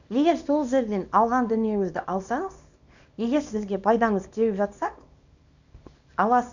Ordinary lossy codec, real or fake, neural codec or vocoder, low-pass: none; fake; codec, 24 kHz, 0.9 kbps, WavTokenizer, small release; 7.2 kHz